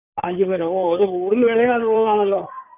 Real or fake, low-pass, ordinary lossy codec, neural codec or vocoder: fake; 3.6 kHz; none; codec, 16 kHz in and 24 kHz out, 2.2 kbps, FireRedTTS-2 codec